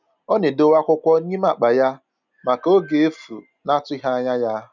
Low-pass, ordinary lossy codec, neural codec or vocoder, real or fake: 7.2 kHz; none; none; real